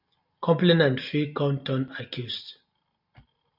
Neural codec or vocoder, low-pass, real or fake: none; 5.4 kHz; real